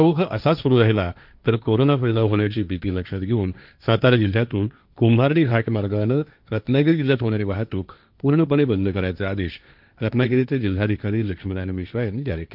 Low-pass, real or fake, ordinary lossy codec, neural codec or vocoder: 5.4 kHz; fake; none; codec, 16 kHz, 1.1 kbps, Voila-Tokenizer